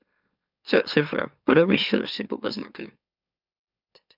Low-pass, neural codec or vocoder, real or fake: 5.4 kHz; autoencoder, 44.1 kHz, a latent of 192 numbers a frame, MeloTTS; fake